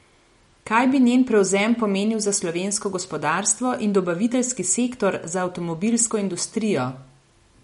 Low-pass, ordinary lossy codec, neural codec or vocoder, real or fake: 19.8 kHz; MP3, 48 kbps; none; real